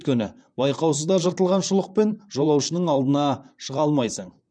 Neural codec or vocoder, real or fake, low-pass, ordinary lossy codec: vocoder, 44.1 kHz, 128 mel bands, Pupu-Vocoder; fake; 9.9 kHz; none